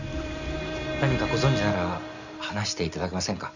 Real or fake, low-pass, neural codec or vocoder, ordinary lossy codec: real; 7.2 kHz; none; none